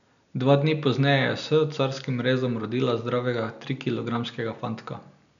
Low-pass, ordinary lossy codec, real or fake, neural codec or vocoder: 7.2 kHz; none; real; none